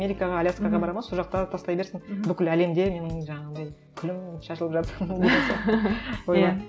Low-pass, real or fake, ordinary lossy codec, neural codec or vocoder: none; real; none; none